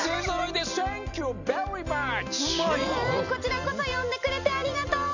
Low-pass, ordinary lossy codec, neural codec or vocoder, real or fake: 7.2 kHz; none; none; real